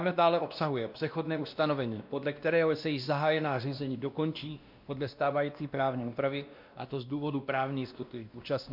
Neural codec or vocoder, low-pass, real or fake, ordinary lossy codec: codec, 16 kHz, 1 kbps, X-Codec, WavLM features, trained on Multilingual LibriSpeech; 5.4 kHz; fake; MP3, 48 kbps